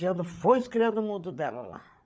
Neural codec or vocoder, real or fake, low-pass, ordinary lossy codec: codec, 16 kHz, 8 kbps, FreqCodec, larger model; fake; none; none